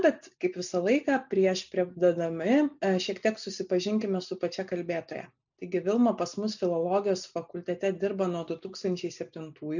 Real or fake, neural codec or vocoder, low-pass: real; none; 7.2 kHz